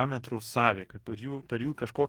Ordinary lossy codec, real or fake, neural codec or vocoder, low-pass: Opus, 24 kbps; fake; codec, 44.1 kHz, 2.6 kbps, DAC; 19.8 kHz